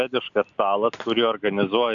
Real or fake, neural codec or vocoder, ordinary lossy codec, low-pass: real; none; Opus, 64 kbps; 7.2 kHz